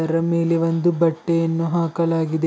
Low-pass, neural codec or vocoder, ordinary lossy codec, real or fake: none; none; none; real